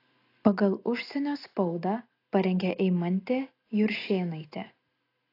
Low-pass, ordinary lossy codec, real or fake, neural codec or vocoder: 5.4 kHz; AAC, 32 kbps; real; none